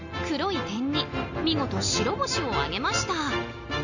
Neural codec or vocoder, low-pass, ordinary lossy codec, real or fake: none; 7.2 kHz; none; real